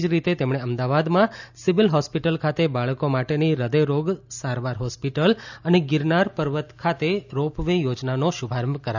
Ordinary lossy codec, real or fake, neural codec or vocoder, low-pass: none; real; none; 7.2 kHz